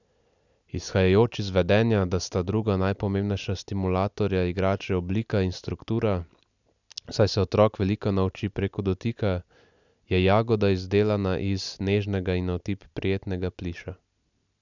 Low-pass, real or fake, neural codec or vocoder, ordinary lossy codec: 7.2 kHz; real; none; none